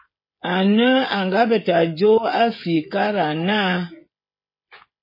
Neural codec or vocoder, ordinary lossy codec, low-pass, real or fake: codec, 16 kHz, 16 kbps, FreqCodec, smaller model; MP3, 24 kbps; 5.4 kHz; fake